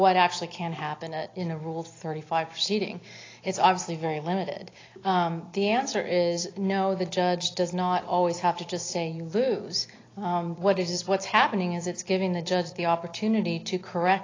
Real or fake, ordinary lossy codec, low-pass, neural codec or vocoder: real; AAC, 32 kbps; 7.2 kHz; none